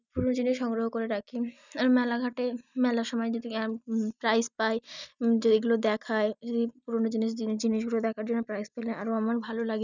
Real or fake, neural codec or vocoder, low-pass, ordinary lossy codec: real; none; 7.2 kHz; none